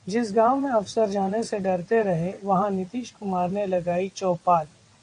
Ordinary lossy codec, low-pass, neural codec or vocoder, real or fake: AAC, 48 kbps; 9.9 kHz; vocoder, 22.05 kHz, 80 mel bands, WaveNeXt; fake